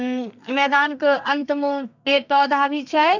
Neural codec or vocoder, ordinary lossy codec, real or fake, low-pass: codec, 32 kHz, 1.9 kbps, SNAC; none; fake; 7.2 kHz